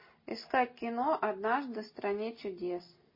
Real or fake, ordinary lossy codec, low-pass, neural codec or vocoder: real; MP3, 24 kbps; 5.4 kHz; none